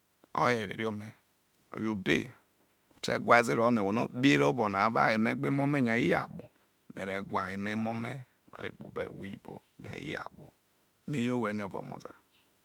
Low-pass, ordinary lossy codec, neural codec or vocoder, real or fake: 19.8 kHz; MP3, 96 kbps; autoencoder, 48 kHz, 32 numbers a frame, DAC-VAE, trained on Japanese speech; fake